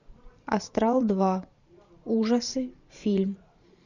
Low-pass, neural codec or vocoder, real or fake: 7.2 kHz; vocoder, 44.1 kHz, 128 mel bands every 512 samples, BigVGAN v2; fake